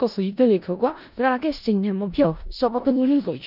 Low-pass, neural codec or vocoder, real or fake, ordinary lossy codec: 5.4 kHz; codec, 16 kHz in and 24 kHz out, 0.4 kbps, LongCat-Audio-Codec, four codebook decoder; fake; none